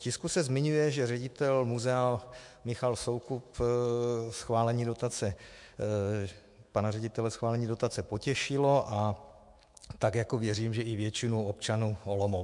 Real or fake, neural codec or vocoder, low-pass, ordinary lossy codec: fake; autoencoder, 48 kHz, 128 numbers a frame, DAC-VAE, trained on Japanese speech; 10.8 kHz; MP3, 64 kbps